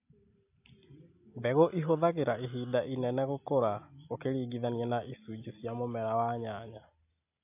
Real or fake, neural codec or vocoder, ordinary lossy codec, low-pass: real; none; AAC, 32 kbps; 3.6 kHz